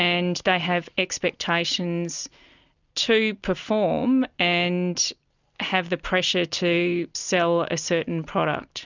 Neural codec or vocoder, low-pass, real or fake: none; 7.2 kHz; real